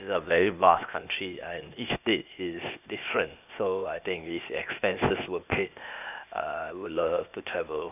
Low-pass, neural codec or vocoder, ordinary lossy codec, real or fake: 3.6 kHz; codec, 16 kHz, 0.8 kbps, ZipCodec; none; fake